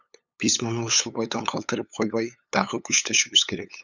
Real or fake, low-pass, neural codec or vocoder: fake; 7.2 kHz; codec, 16 kHz, 8 kbps, FunCodec, trained on LibriTTS, 25 frames a second